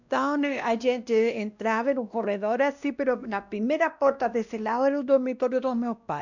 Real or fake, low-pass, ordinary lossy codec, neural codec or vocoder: fake; 7.2 kHz; none; codec, 16 kHz, 1 kbps, X-Codec, WavLM features, trained on Multilingual LibriSpeech